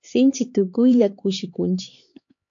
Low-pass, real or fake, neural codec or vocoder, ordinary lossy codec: 7.2 kHz; fake; codec, 16 kHz, 4 kbps, X-Codec, HuBERT features, trained on LibriSpeech; AAC, 64 kbps